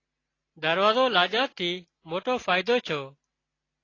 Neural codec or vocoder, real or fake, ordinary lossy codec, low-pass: none; real; AAC, 32 kbps; 7.2 kHz